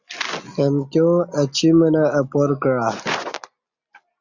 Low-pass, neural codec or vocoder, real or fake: 7.2 kHz; none; real